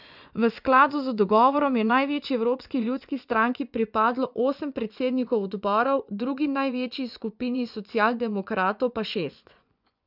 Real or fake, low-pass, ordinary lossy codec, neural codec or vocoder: fake; 5.4 kHz; none; vocoder, 24 kHz, 100 mel bands, Vocos